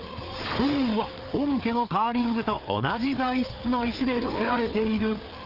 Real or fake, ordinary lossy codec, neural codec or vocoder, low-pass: fake; Opus, 24 kbps; codec, 16 kHz, 4 kbps, FreqCodec, larger model; 5.4 kHz